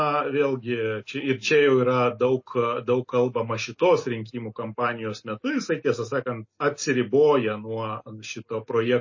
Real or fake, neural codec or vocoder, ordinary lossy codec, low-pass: real; none; MP3, 32 kbps; 7.2 kHz